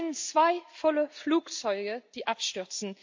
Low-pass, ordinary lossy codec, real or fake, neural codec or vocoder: 7.2 kHz; MP3, 48 kbps; real; none